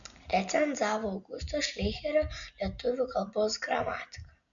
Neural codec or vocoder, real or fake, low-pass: none; real; 7.2 kHz